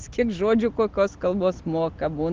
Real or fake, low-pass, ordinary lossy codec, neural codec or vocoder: real; 7.2 kHz; Opus, 16 kbps; none